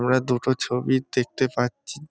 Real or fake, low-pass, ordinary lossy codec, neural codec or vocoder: real; none; none; none